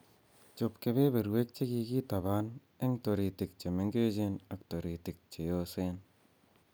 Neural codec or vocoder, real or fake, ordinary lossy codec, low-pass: none; real; none; none